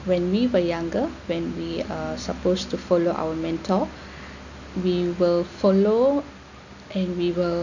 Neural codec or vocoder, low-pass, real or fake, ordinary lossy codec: none; 7.2 kHz; real; none